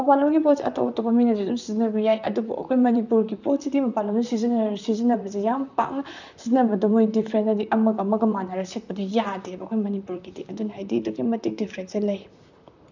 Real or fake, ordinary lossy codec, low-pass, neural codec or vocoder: fake; none; 7.2 kHz; vocoder, 44.1 kHz, 128 mel bands, Pupu-Vocoder